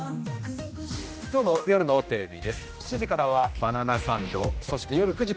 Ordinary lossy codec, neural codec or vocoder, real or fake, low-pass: none; codec, 16 kHz, 1 kbps, X-Codec, HuBERT features, trained on general audio; fake; none